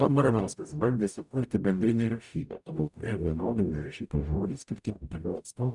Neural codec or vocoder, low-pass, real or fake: codec, 44.1 kHz, 0.9 kbps, DAC; 10.8 kHz; fake